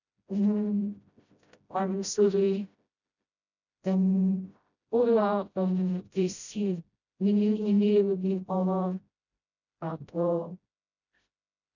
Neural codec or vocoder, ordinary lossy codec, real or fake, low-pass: codec, 16 kHz, 0.5 kbps, FreqCodec, smaller model; none; fake; 7.2 kHz